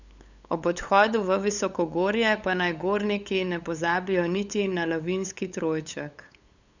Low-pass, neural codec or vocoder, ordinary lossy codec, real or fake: 7.2 kHz; codec, 16 kHz, 8 kbps, FunCodec, trained on LibriTTS, 25 frames a second; none; fake